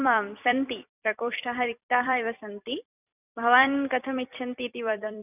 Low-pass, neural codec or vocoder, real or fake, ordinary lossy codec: 3.6 kHz; vocoder, 44.1 kHz, 128 mel bands every 256 samples, BigVGAN v2; fake; none